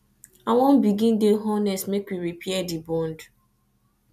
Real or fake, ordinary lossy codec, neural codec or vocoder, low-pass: real; none; none; 14.4 kHz